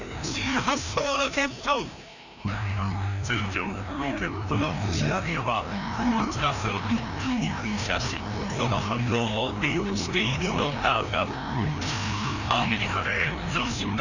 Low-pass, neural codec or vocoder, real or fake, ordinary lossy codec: 7.2 kHz; codec, 16 kHz, 1 kbps, FreqCodec, larger model; fake; none